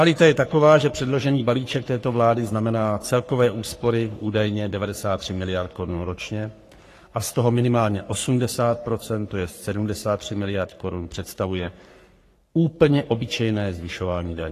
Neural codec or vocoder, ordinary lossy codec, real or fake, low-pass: codec, 44.1 kHz, 3.4 kbps, Pupu-Codec; AAC, 48 kbps; fake; 14.4 kHz